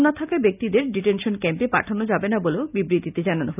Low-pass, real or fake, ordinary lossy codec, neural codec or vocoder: 3.6 kHz; real; none; none